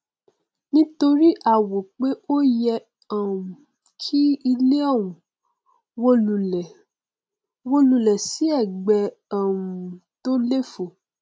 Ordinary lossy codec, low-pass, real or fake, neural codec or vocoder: none; none; real; none